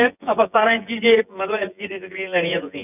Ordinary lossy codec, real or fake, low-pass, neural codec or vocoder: none; fake; 3.6 kHz; vocoder, 24 kHz, 100 mel bands, Vocos